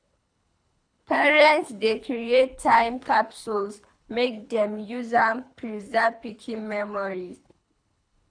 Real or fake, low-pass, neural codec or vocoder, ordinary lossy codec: fake; 9.9 kHz; codec, 24 kHz, 3 kbps, HILCodec; none